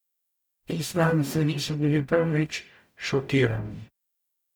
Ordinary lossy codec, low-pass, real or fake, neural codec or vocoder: none; none; fake; codec, 44.1 kHz, 0.9 kbps, DAC